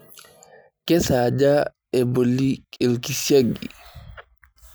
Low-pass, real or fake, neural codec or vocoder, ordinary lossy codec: none; real; none; none